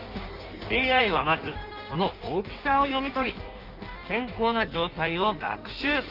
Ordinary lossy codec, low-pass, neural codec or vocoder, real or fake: Opus, 32 kbps; 5.4 kHz; codec, 16 kHz in and 24 kHz out, 1.1 kbps, FireRedTTS-2 codec; fake